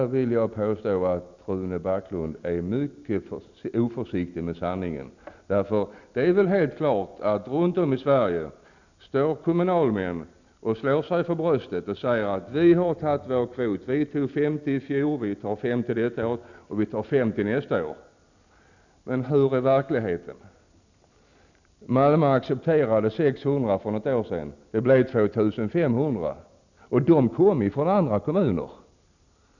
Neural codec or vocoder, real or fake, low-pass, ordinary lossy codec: none; real; 7.2 kHz; none